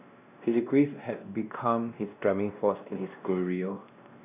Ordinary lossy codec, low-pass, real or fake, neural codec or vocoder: none; 3.6 kHz; fake; codec, 16 kHz, 1 kbps, X-Codec, WavLM features, trained on Multilingual LibriSpeech